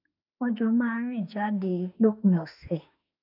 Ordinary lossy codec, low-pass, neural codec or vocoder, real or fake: none; 5.4 kHz; autoencoder, 48 kHz, 32 numbers a frame, DAC-VAE, trained on Japanese speech; fake